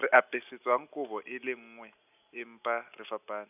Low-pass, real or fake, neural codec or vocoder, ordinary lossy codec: 3.6 kHz; real; none; none